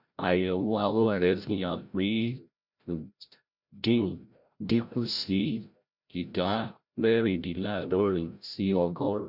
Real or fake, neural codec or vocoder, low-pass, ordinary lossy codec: fake; codec, 16 kHz, 0.5 kbps, FreqCodec, larger model; 5.4 kHz; Opus, 64 kbps